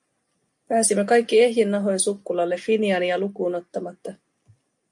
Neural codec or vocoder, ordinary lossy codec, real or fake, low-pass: none; MP3, 64 kbps; real; 10.8 kHz